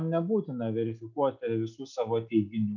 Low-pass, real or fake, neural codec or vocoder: 7.2 kHz; real; none